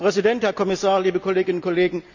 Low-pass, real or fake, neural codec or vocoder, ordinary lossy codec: 7.2 kHz; real; none; none